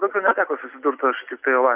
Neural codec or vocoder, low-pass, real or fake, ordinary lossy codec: none; 3.6 kHz; real; Opus, 64 kbps